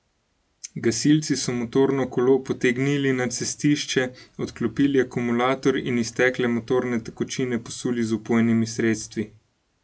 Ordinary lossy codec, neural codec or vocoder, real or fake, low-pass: none; none; real; none